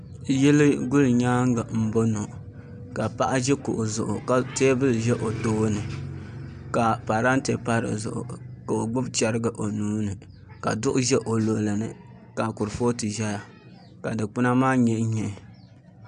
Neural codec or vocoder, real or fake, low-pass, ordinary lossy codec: none; real; 9.9 kHz; MP3, 96 kbps